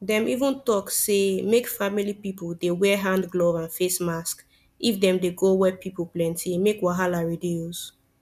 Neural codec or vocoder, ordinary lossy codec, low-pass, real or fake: none; none; 14.4 kHz; real